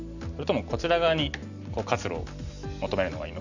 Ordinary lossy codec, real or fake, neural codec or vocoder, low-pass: none; real; none; 7.2 kHz